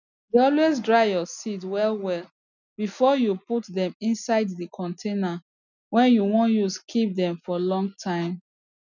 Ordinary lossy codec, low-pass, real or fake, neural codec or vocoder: none; 7.2 kHz; real; none